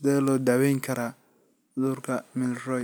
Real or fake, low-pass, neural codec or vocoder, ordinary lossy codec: real; none; none; none